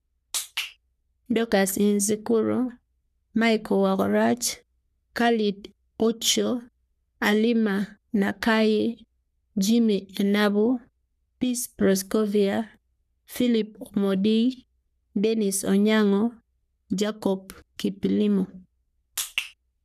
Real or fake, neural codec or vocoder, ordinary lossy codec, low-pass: fake; codec, 44.1 kHz, 3.4 kbps, Pupu-Codec; none; 14.4 kHz